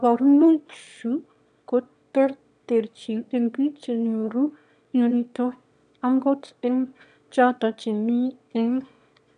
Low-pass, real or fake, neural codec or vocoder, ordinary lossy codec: 9.9 kHz; fake; autoencoder, 22.05 kHz, a latent of 192 numbers a frame, VITS, trained on one speaker; none